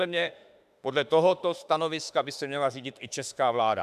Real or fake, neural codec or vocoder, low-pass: fake; autoencoder, 48 kHz, 32 numbers a frame, DAC-VAE, trained on Japanese speech; 14.4 kHz